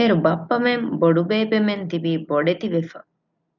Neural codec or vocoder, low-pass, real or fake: none; 7.2 kHz; real